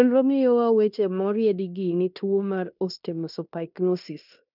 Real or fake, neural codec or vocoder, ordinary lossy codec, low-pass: fake; codec, 16 kHz in and 24 kHz out, 0.9 kbps, LongCat-Audio-Codec, fine tuned four codebook decoder; none; 5.4 kHz